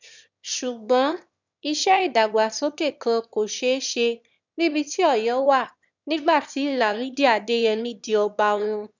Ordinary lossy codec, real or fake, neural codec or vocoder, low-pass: none; fake; autoencoder, 22.05 kHz, a latent of 192 numbers a frame, VITS, trained on one speaker; 7.2 kHz